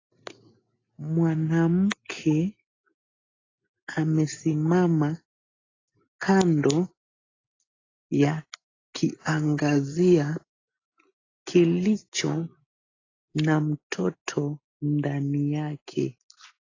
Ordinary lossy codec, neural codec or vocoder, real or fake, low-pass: AAC, 32 kbps; none; real; 7.2 kHz